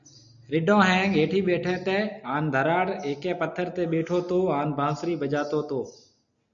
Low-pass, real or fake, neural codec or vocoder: 7.2 kHz; real; none